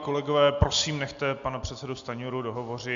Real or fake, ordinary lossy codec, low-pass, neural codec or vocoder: real; MP3, 64 kbps; 7.2 kHz; none